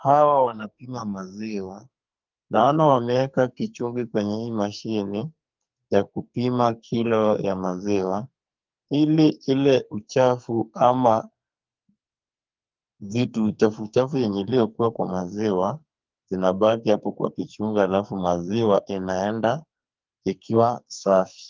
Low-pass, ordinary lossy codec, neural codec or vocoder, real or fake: 7.2 kHz; Opus, 24 kbps; codec, 44.1 kHz, 2.6 kbps, SNAC; fake